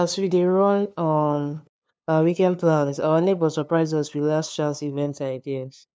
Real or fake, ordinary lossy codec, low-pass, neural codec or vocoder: fake; none; none; codec, 16 kHz, 2 kbps, FunCodec, trained on LibriTTS, 25 frames a second